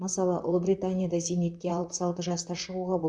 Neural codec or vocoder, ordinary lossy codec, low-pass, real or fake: vocoder, 44.1 kHz, 128 mel bands, Pupu-Vocoder; none; 9.9 kHz; fake